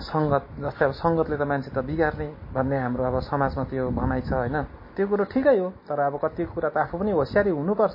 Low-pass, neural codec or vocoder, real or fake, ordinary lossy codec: 5.4 kHz; none; real; MP3, 24 kbps